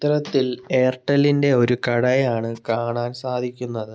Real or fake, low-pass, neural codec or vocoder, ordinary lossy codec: real; none; none; none